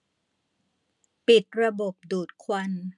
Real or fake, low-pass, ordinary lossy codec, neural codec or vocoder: real; 10.8 kHz; none; none